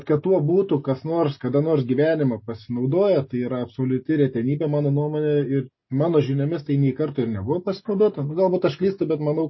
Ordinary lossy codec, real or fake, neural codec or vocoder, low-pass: MP3, 24 kbps; real; none; 7.2 kHz